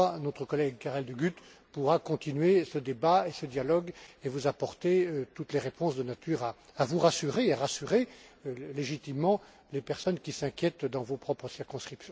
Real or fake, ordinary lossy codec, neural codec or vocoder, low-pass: real; none; none; none